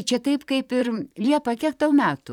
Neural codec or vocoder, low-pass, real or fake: vocoder, 44.1 kHz, 128 mel bands, Pupu-Vocoder; 19.8 kHz; fake